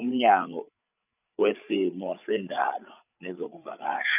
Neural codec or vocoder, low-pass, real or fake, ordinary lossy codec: codec, 16 kHz, 8 kbps, FreqCodec, larger model; 3.6 kHz; fake; none